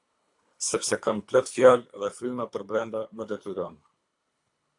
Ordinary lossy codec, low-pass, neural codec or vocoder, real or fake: AAC, 64 kbps; 10.8 kHz; codec, 24 kHz, 3 kbps, HILCodec; fake